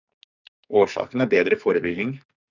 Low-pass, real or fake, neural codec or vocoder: 7.2 kHz; fake; codec, 44.1 kHz, 2.6 kbps, SNAC